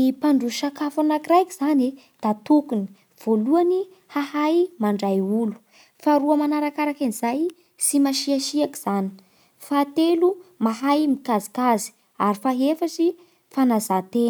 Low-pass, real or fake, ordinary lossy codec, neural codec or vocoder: none; real; none; none